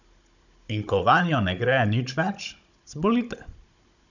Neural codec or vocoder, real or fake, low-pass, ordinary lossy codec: codec, 16 kHz, 16 kbps, FunCodec, trained on Chinese and English, 50 frames a second; fake; 7.2 kHz; none